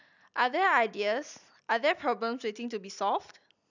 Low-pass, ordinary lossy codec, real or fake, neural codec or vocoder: 7.2 kHz; none; real; none